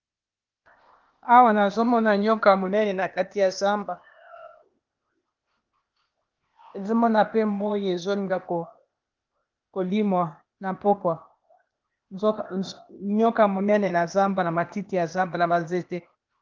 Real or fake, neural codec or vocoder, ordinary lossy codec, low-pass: fake; codec, 16 kHz, 0.8 kbps, ZipCodec; Opus, 32 kbps; 7.2 kHz